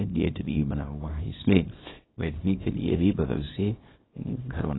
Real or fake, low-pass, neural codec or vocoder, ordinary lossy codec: fake; 7.2 kHz; codec, 24 kHz, 0.9 kbps, WavTokenizer, small release; AAC, 16 kbps